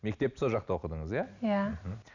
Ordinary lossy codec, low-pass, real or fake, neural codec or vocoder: none; 7.2 kHz; real; none